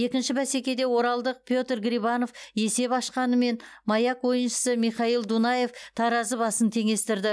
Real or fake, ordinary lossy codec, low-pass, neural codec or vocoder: real; none; none; none